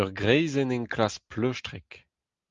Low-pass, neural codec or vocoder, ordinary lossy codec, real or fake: 7.2 kHz; none; Opus, 32 kbps; real